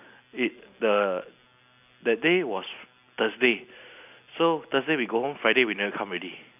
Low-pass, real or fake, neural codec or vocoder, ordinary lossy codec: 3.6 kHz; real; none; none